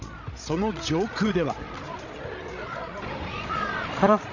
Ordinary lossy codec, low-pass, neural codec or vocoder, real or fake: none; 7.2 kHz; codec, 16 kHz, 16 kbps, FreqCodec, larger model; fake